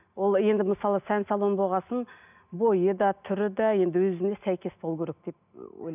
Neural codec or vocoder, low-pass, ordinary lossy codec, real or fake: none; 3.6 kHz; none; real